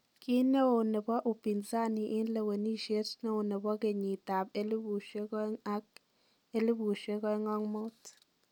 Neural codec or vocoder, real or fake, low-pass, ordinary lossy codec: none; real; 19.8 kHz; none